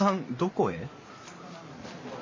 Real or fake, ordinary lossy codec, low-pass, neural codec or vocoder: real; MP3, 32 kbps; 7.2 kHz; none